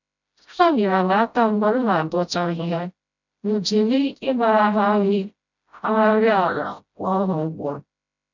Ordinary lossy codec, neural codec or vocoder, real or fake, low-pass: none; codec, 16 kHz, 0.5 kbps, FreqCodec, smaller model; fake; 7.2 kHz